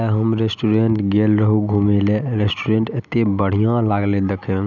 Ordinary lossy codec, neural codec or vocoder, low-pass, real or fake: none; none; 7.2 kHz; real